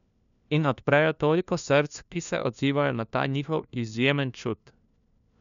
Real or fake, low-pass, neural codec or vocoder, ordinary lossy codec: fake; 7.2 kHz; codec, 16 kHz, 1 kbps, FunCodec, trained on LibriTTS, 50 frames a second; none